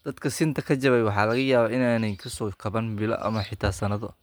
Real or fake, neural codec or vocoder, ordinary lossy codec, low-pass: real; none; none; none